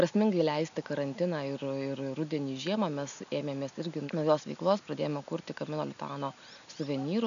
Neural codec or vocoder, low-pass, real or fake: none; 7.2 kHz; real